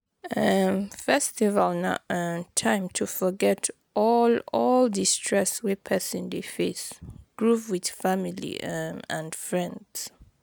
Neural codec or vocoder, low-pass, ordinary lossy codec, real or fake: none; none; none; real